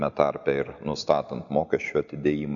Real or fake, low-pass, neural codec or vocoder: real; 7.2 kHz; none